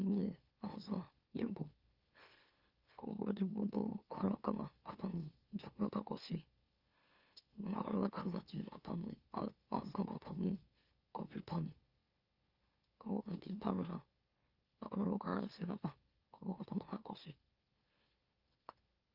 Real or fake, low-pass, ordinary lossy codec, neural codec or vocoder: fake; 5.4 kHz; Opus, 32 kbps; autoencoder, 44.1 kHz, a latent of 192 numbers a frame, MeloTTS